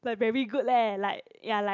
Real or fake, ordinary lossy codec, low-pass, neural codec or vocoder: real; none; 7.2 kHz; none